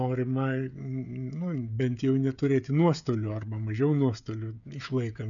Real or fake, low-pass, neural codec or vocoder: fake; 7.2 kHz; codec, 16 kHz, 16 kbps, FreqCodec, smaller model